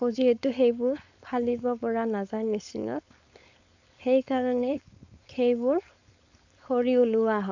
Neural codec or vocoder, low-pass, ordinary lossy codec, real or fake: codec, 16 kHz, 4.8 kbps, FACodec; 7.2 kHz; AAC, 48 kbps; fake